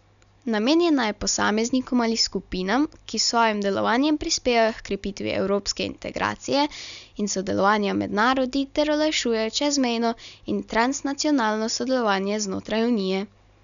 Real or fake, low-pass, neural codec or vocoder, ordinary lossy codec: real; 7.2 kHz; none; none